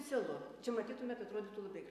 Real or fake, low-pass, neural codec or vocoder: real; 14.4 kHz; none